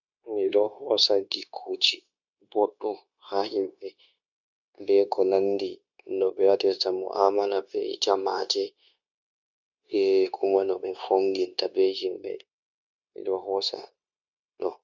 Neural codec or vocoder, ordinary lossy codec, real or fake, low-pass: codec, 16 kHz, 0.9 kbps, LongCat-Audio-Codec; none; fake; 7.2 kHz